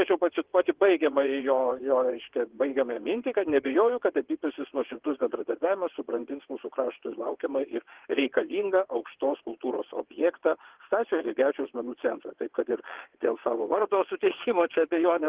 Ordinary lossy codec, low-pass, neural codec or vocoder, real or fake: Opus, 16 kbps; 3.6 kHz; vocoder, 22.05 kHz, 80 mel bands, WaveNeXt; fake